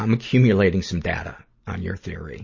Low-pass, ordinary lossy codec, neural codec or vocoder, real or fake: 7.2 kHz; MP3, 32 kbps; none; real